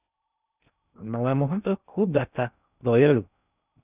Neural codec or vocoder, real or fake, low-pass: codec, 16 kHz in and 24 kHz out, 0.6 kbps, FocalCodec, streaming, 2048 codes; fake; 3.6 kHz